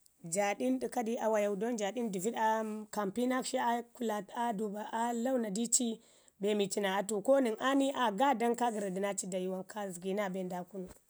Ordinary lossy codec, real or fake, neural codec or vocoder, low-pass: none; real; none; none